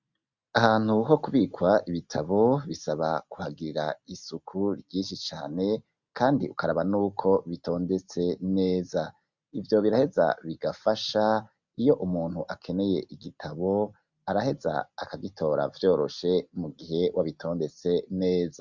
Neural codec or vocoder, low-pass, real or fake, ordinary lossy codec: none; 7.2 kHz; real; Opus, 64 kbps